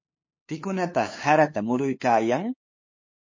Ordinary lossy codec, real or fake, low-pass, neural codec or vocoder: MP3, 32 kbps; fake; 7.2 kHz; codec, 16 kHz, 2 kbps, FunCodec, trained on LibriTTS, 25 frames a second